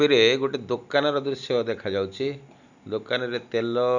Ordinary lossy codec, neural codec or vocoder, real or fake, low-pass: none; none; real; 7.2 kHz